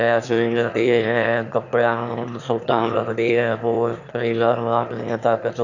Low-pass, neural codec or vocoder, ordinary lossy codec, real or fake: 7.2 kHz; autoencoder, 22.05 kHz, a latent of 192 numbers a frame, VITS, trained on one speaker; none; fake